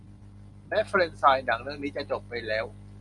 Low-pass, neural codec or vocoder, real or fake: 10.8 kHz; none; real